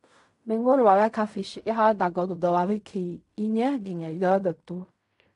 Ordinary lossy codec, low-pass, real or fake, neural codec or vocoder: AAC, 64 kbps; 10.8 kHz; fake; codec, 16 kHz in and 24 kHz out, 0.4 kbps, LongCat-Audio-Codec, fine tuned four codebook decoder